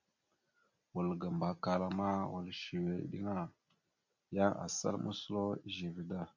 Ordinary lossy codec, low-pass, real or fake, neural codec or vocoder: MP3, 48 kbps; 7.2 kHz; real; none